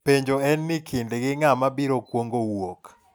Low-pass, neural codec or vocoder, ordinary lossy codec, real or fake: none; none; none; real